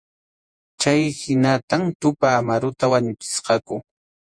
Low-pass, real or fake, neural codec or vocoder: 9.9 kHz; fake; vocoder, 48 kHz, 128 mel bands, Vocos